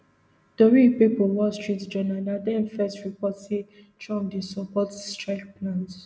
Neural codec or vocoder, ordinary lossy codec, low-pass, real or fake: none; none; none; real